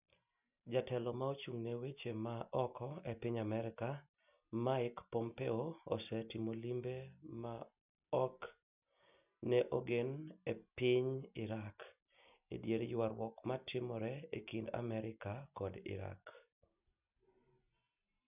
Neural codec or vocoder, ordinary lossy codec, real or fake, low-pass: none; none; real; 3.6 kHz